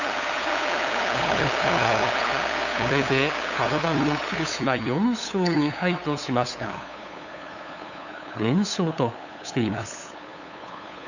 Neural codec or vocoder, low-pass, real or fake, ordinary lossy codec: codec, 16 kHz, 8 kbps, FunCodec, trained on LibriTTS, 25 frames a second; 7.2 kHz; fake; none